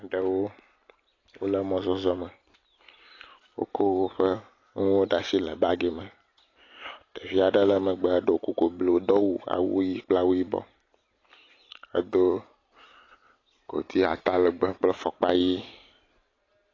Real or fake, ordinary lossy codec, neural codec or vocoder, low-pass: real; AAC, 32 kbps; none; 7.2 kHz